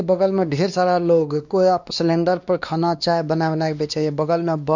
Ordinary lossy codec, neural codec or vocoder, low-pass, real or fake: none; codec, 16 kHz, 2 kbps, X-Codec, WavLM features, trained on Multilingual LibriSpeech; 7.2 kHz; fake